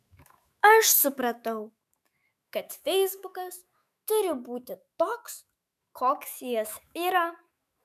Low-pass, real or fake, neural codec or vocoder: 14.4 kHz; fake; autoencoder, 48 kHz, 128 numbers a frame, DAC-VAE, trained on Japanese speech